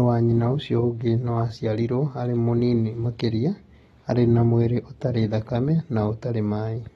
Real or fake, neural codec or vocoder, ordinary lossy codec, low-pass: real; none; AAC, 32 kbps; 14.4 kHz